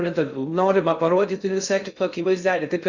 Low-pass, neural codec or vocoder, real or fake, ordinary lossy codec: 7.2 kHz; codec, 16 kHz in and 24 kHz out, 0.6 kbps, FocalCodec, streaming, 2048 codes; fake; Opus, 64 kbps